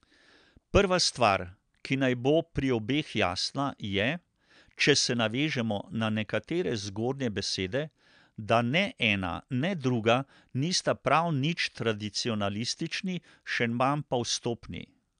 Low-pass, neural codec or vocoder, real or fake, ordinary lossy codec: 9.9 kHz; none; real; MP3, 96 kbps